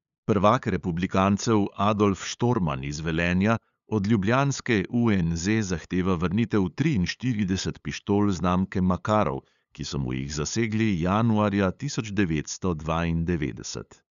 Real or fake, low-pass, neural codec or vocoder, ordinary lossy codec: fake; 7.2 kHz; codec, 16 kHz, 8 kbps, FunCodec, trained on LibriTTS, 25 frames a second; none